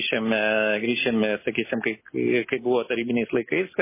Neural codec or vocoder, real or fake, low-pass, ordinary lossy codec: none; real; 3.6 kHz; MP3, 16 kbps